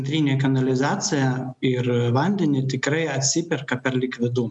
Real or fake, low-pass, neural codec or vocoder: real; 10.8 kHz; none